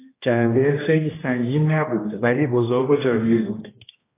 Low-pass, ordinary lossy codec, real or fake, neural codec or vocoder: 3.6 kHz; AAC, 16 kbps; fake; codec, 16 kHz, 1 kbps, X-Codec, HuBERT features, trained on general audio